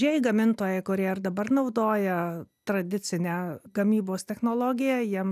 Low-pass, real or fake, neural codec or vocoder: 14.4 kHz; real; none